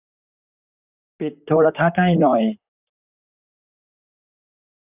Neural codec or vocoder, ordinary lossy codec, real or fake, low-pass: vocoder, 44.1 kHz, 128 mel bands, Pupu-Vocoder; none; fake; 3.6 kHz